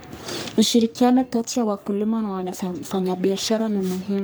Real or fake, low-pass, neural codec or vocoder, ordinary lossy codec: fake; none; codec, 44.1 kHz, 3.4 kbps, Pupu-Codec; none